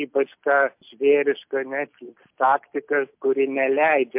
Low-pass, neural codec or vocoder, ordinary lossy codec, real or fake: 3.6 kHz; none; MP3, 32 kbps; real